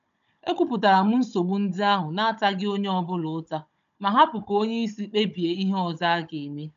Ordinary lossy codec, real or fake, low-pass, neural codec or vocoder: none; fake; 7.2 kHz; codec, 16 kHz, 16 kbps, FunCodec, trained on Chinese and English, 50 frames a second